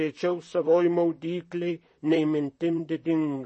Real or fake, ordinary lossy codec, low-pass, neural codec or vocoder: fake; MP3, 32 kbps; 10.8 kHz; vocoder, 44.1 kHz, 128 mel bands, Pupu-Vocoder